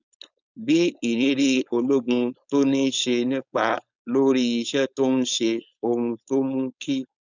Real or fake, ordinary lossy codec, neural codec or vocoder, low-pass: fake; none; codec, 16 kHz, 4.8 kbps, FACodec; 7.2 kHz